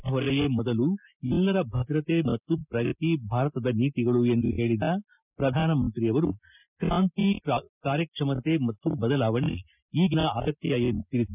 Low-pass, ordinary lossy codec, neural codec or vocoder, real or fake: 3.6 kHz; none; none; real